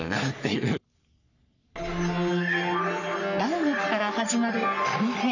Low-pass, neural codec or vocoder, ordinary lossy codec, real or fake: 7.2 kHz; codec, 44.1 kHz, 3.4 kbps, Pupu-Codec; none; fake